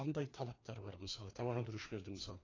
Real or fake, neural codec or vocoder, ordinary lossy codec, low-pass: fake; codec, 16 kHz, 2 kbps, FreqCodec, larger model; AAC, 32 kbps; 7.2 kHz